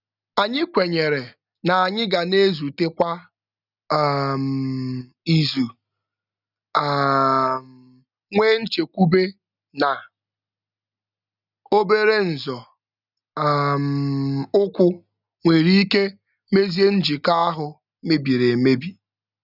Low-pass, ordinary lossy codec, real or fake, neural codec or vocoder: 5.4 kHz; none; real; none